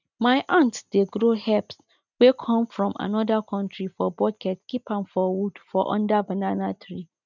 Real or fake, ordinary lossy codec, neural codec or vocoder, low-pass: real; none; none; 7.2 kHz